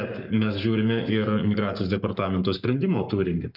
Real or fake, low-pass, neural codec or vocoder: fake; 5.4 kHz; codec, 44.1 kHz, 7.8 kbps, Pupu-Codec